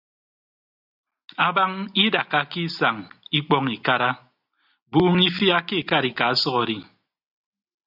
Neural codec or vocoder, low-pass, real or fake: none; 5.4 kHz; real